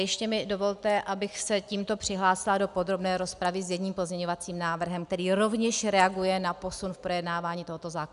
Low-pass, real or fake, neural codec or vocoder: 10.8 kHz; real; none